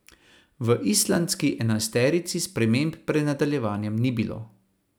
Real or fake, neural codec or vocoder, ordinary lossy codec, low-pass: real; none; none; none